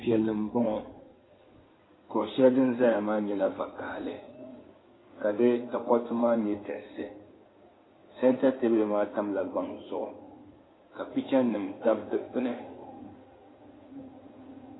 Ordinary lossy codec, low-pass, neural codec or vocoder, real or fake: AAC, 16 kbps; 7.2 kHz; codec, 16 kHz in and 24 kHz out, 2.2 kbps, FireRedTTS-2 codec; fake